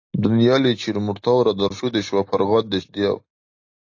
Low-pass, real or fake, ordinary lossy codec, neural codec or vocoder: 7.2 kHz; real; AAC, 48 kbps; none